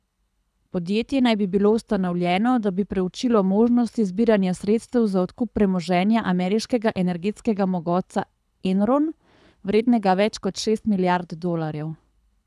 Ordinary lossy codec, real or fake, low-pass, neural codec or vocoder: none; fake; none; codec, 24 kHz, 6 kbps, HILCodec